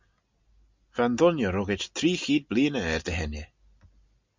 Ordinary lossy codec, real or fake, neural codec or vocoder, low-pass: MP3, 64 kbps; real; none; 7.2 kHz